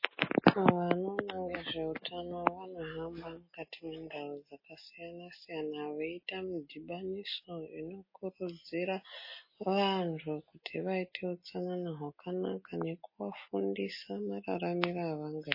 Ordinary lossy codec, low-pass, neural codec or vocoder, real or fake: MP3, 24 kbps; 5.4 kHz; none; real